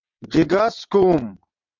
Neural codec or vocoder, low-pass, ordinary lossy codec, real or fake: vocoder, 22.05 kHz, 80 mel bands, WaveNeXt; 7.2 kHz; MP3, 64 kbps; fake